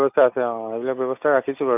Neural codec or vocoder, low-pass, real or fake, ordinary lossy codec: none; 3.6 kHz; real; none